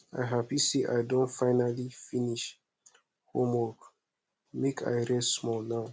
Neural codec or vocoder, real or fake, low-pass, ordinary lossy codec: none; real; none; none